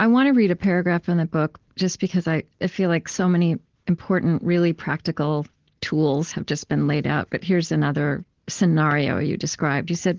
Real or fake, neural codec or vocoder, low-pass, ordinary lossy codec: real; none; 7.2 kHz; Opus, 16 kbps